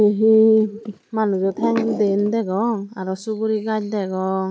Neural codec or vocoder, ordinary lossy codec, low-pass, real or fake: none; none; none; real